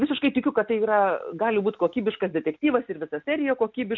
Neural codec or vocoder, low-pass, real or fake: none; 7.2 kHz; real